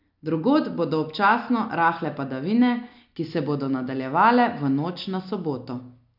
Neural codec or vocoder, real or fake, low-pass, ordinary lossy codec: none; real; 5.4 kHz; none